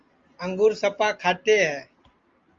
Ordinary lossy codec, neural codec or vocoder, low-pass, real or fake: Opus, 32 kbps; none; 7.2 kHz; real